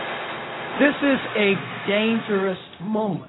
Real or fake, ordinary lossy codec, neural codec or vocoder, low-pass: fake; AAC, 16 kbps; codec, 16 kHz, 0.4 kbps, LongCat-Audio-Codec; 7.2 kHz